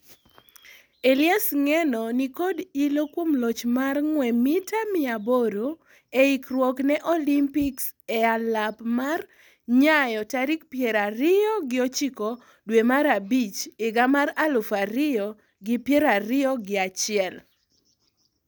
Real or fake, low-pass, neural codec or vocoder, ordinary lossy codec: real; none; none; none